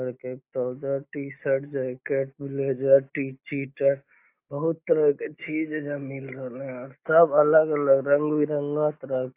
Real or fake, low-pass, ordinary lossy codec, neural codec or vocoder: real; 3.6 kHz; AAC, 24 kbps; none